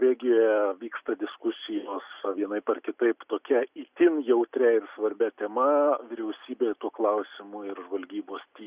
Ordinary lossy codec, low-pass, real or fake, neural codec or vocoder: Opus, 64 kbps; 3.6 kHz; real; none